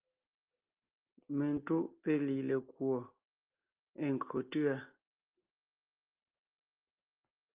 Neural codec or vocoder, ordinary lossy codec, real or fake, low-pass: none; Opus, 32 kbps; real; 3.6 kHz